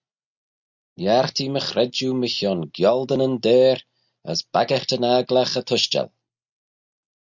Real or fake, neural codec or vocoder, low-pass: real; none; 7.2 kHz